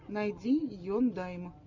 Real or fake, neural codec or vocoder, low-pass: real; none; 7.2 kHz